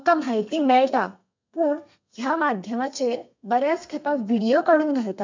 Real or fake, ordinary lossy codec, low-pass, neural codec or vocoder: fake; MP3, 64 kbps; 7.2 kHz; codec, 32 kHz, 1.9 kbps, SNAC